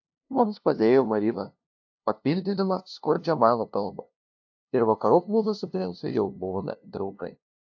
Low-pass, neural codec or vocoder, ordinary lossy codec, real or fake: 7.2 kHz; codec, 16 kHz, 0.5 kbps, FunCodec, trained on LibriTTS, 25 frames a second; AAC, 48 kbps; fake